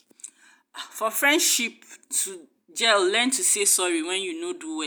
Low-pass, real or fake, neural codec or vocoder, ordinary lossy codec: none; real; none; none